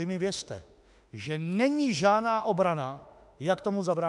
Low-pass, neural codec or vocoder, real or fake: 10.8 kHz; autoencoder, 48 kHz, 32 numbers a frame, DAC-VAE, trained on Japanese speech; fake